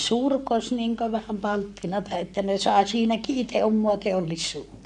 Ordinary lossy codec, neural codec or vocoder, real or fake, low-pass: none; codec, 44.1 kHz, 7.8 kbps, Pupu-Codec; fake; 10.8 kHz